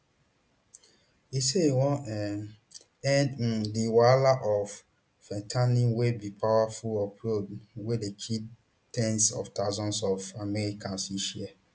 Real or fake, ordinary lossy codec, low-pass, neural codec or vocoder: real; none; none; none